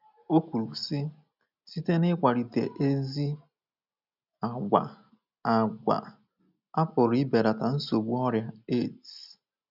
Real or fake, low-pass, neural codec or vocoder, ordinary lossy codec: real; 5.4 kHz; none; none